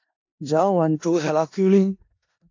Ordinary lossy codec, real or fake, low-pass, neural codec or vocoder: AAC, 48 kbps; fake; 7.2 kHz; codec, 16 kHz in and 24 kHz out, 0.4 kbps, LongCat-Audio-Codec, four codebook decoder